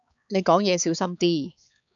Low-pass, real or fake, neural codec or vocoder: 7.2 kHz; fake; codec, 16 kHz, 4 kbps, X-Codec, HuBERT features, trained on balanced general audio